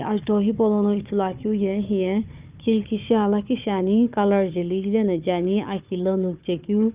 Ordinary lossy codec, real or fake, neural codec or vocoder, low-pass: Opus, 24 kbps; fake; codec, 16 kHz, 4 kbps, FunCodec, trained on Chinese and English, 50 frames a second; 3.6 kHz